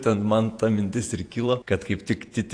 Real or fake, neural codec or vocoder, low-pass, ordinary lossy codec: fake; vocoder, 48 kHz, 128 mel bands, Vocos; 9.9 kHz; AAC, 48 kbps